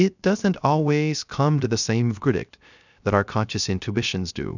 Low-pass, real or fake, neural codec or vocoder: 7.2 kHz; fake; codec, 16 kHz, 0.3 kbps, FocalCodec